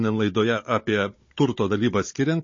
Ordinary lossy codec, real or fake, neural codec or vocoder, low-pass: MP3, 32 kbps; fake; codec, 16 kHz, 16 kbps, FunCodec, trained on Chinese and English, 50 frames a second; 7.2 kHz